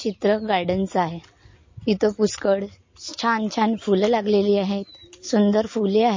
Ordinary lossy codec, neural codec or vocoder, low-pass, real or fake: MP3, 32 kbps; none; 7.2 kHz; real